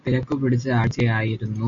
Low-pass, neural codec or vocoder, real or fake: 7.2 kHz; none; real